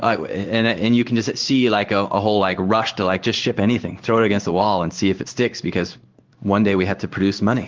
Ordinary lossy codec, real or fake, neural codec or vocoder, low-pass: Opus, 32 kbps; fake; codec, 16 kHz in and 24 kHz out, 1 kbps, XY-Tokenizer; 7.2 kHz